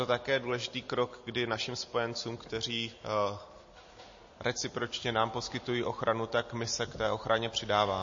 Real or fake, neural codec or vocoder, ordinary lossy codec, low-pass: real; none; MP3, 32 kbps; 7.2 kHz